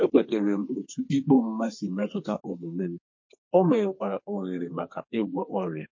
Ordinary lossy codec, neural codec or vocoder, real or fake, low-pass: MP3, 32 kbps; codec, 32 kHz, 1.9 kbps, SNAC; fake; 7.2 kHz